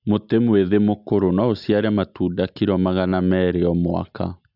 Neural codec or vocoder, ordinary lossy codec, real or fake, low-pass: none; none; real; 5.4 kHz